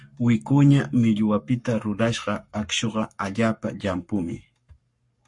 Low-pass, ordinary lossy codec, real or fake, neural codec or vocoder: 10.8 kHz; MP3, 48 kbps; fake; codec, 44.1 kHz, 7.8 kbps, Pupu-Codec